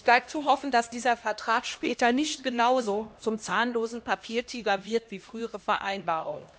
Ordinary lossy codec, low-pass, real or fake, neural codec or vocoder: none; none; fake; codec, 16 kHz, 1 kbps, X-Codec, HuBERT features, trained on LibriSpeech